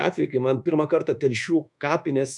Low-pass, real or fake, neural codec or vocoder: 10.8 kHz; fake; codec, 24 kHz, 1.2 kbps, DualCodec